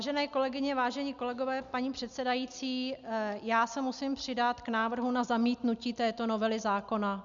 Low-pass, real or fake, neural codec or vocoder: 7.2 kHz; real; none